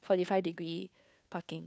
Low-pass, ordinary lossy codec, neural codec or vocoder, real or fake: none; none; codec, 16 kHz, 2 kbps, FunCodec, trained on Chinese and English, 25 frames a second; fake